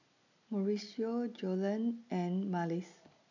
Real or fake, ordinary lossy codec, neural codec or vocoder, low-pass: real; none; none; 7.2 kHz